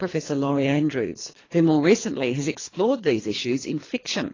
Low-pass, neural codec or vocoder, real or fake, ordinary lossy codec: 7.2 kHz; codec, 24 kHz, 3 kbps, HILCodec; fake; AAC, 32 kbps